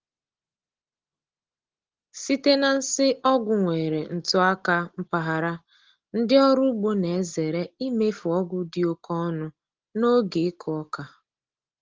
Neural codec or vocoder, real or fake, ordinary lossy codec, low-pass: none; real; Opus, 16 kbps; 7.2 kHz